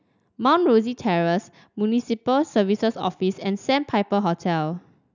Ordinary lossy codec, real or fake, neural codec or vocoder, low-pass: none; real; none; 7.2 kHz